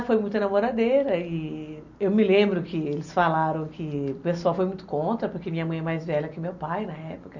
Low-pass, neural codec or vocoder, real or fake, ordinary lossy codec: 7.2 kHz; none; real; none